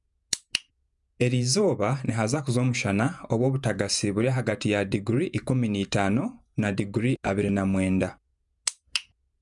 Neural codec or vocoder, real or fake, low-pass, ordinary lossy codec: vocoder, 48 kHz, 128 mel bands, Vocos; fake; 10.8 kHz; none